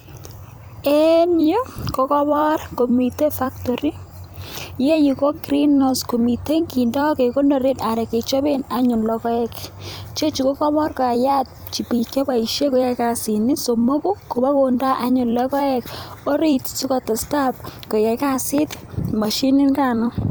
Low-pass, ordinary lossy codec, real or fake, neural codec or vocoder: none; none; fake; vocoder, 44.1 kHz, 128 mel bands every 256 samples, BigVGAN v2